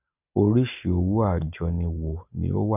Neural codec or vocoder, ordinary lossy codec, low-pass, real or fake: none; none; 3.6 kHz; real